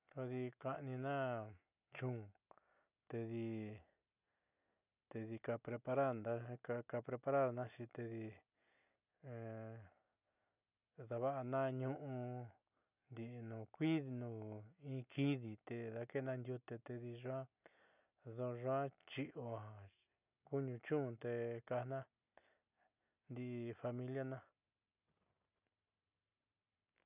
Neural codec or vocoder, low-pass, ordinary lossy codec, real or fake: none; 3.6 kHz; none; real